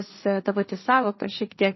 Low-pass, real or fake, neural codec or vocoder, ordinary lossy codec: 7.2 kHz; fake; codec, 16 kHz, 1.1 kbps, Voila-Tokenizer; MP3, 24 kbps